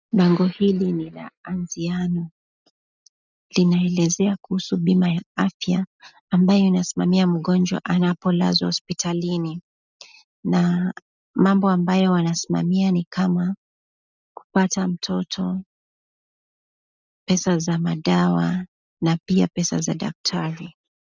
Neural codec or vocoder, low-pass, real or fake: none; 7.2 kHz; real